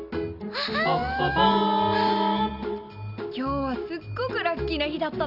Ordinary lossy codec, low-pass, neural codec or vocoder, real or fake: none; 5.4 kHz; none; real